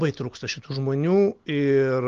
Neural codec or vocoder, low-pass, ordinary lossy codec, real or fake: none; 7.2 kHz; Opus, 16 kbps; real